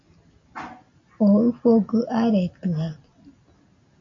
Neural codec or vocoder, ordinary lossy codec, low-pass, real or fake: none; MP3, 48 kbps; 7.2 kHz; real